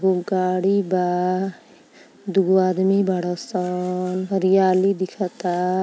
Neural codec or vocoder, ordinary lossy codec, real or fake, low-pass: none; none; real; none